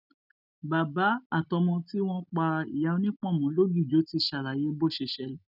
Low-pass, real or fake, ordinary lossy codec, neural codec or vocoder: 5.4 kHz; real; none; none